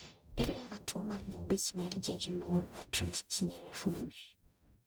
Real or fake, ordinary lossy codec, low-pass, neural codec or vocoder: fake; none; none; codec, 44.1 kHz, 0.9 kbps, DAC